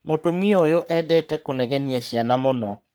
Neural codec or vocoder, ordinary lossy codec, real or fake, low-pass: codec, 44.1 kHz, 3.4 kbps, Pupu-Codec; none; fake; none